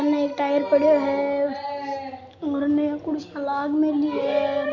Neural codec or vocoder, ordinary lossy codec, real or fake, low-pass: none; none; real; 7.2 kHz